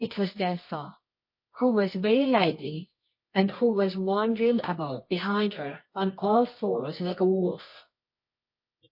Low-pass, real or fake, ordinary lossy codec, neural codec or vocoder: 5.4 kHz; fake; MP3, 32 kbps; codec, 24 kHz, 0.9 kbps, WavTokenizer, medium music audio release